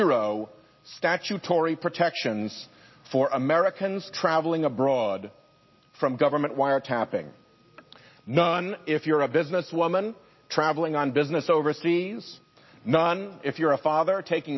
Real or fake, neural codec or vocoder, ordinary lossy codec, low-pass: real; none; MP3, 24 kbps; 7.2 kHz